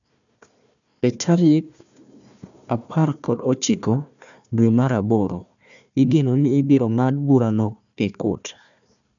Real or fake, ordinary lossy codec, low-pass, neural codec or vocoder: fake; none; 7.2 kHz; codec, 16 kHz, 1 kbps, FunCodec, trained on Chinese and English, 50 frames a second